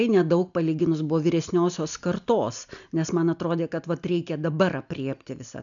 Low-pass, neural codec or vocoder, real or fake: 7.2 kHz; none; real